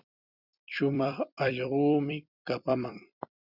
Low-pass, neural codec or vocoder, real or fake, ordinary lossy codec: 5.4 kHz; vocoder, 44.1 kHz, 128 mel bands every 256 samples, BigVGAN v2; fake; Opus, 64 kbps